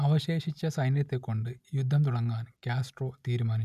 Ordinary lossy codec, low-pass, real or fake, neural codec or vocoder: none; 14.4 kHz; real; none